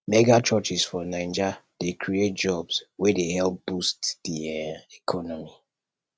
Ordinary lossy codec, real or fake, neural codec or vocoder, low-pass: none; real; none; none